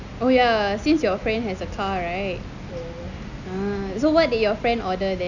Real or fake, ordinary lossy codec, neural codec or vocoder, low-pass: real; none; none; 7.2 kHz